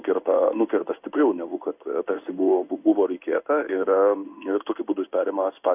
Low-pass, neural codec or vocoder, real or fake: 3.6 kHz; codec, 16 kHz in and 24 kHz out, 1 kbps, XY-Tokenizer; fake